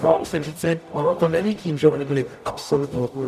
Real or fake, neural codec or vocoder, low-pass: fake; codec, 44.1 kHz, 0.9 kbps, DAC; 14.4 kHz